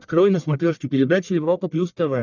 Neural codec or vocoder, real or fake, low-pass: codec, 44.1 kHz, 1.7 kbps, Pupu-Codec; fake; 7.2 kHz